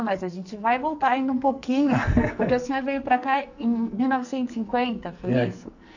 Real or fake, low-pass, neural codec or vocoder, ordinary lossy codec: fake; 7.2 kHz; codec, 44.1 kHz, 2.6 kbps, SNAC; none